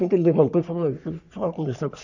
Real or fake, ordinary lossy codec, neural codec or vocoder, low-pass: fake; none; codec, 44.1 kHz, 3.4 kbps, Pupu-Codec; 7.2 kHz